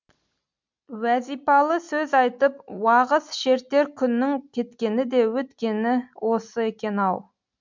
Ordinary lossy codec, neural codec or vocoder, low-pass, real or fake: MP3, 64 kbps; none; 7.2 kHz; real